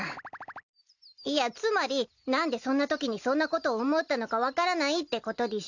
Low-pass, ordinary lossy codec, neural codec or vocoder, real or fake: 7.2 kHz; none; none; real